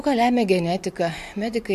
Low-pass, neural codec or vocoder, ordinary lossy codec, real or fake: 14.4 kHz; none; MP3, 64 kbps; real